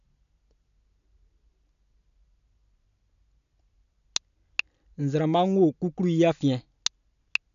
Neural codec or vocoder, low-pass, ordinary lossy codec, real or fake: none; 7.2 kHz; none; real